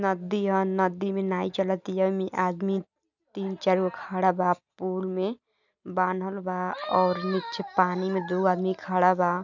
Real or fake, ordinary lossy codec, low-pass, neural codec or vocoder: real; none; 7.2 kHz; none